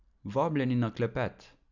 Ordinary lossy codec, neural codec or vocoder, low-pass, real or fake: none; none; 7.2 kHz; real